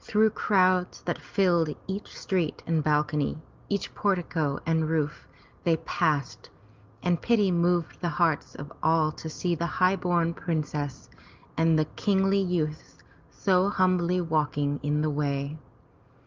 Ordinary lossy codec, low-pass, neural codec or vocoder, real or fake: Opus, 24 kbps; 7.2 kHz; none; real